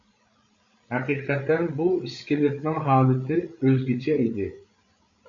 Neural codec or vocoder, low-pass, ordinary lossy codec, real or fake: codec, 16 kHz, 8 kbps, FreqCodec, larger model; 7.2 kHz; MP3, 64 kbps; fake